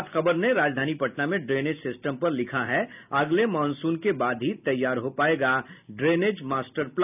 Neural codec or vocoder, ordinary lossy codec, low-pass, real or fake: none; none; 3.6 kHz; real